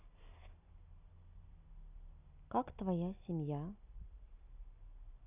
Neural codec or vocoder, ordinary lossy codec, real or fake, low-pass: none; none; real; 3.6 kHz